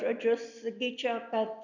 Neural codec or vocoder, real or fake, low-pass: none; real; 7.2 kHz